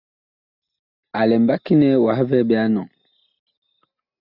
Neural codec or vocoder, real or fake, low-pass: none; real; 5.4 kHz